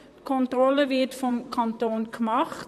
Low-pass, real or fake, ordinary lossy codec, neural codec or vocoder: 14.4 kHz; fake; none; vocoder, 44.1 kHz, 128 mel bands, Pupu-Vocoder